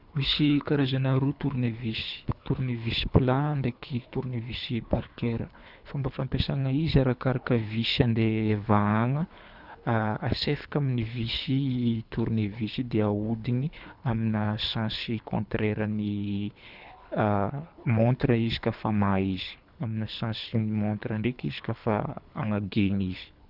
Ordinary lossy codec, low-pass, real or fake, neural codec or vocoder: none; 5.4 kHz; fake; codec, 24 kHz, 3 kbps, HILCodec